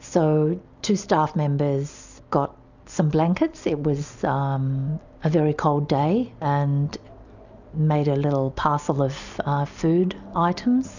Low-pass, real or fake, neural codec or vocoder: 7.2 kHz; real; none